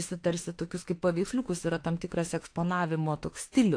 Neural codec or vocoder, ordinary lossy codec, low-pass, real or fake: autoencoder, 48 kHz, 32 numbers a frame, DAC-VAE, trained on Japanese speech; AAC, 48 kbps; 9.9 kHz; fake